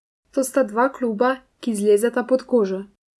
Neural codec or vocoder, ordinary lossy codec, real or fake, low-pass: none; none; real; none